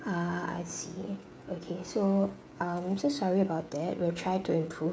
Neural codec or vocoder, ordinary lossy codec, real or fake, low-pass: codec, 16 kHz, 16 kbps, FreqCodec, smaller model; none; fake; none